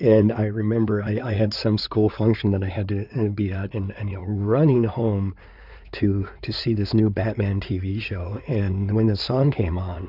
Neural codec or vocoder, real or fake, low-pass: codec, 16 kHz in and 24 kHz out, 2.2 kbps, FireRedTTS-2 codec; fake; 5.4 kHz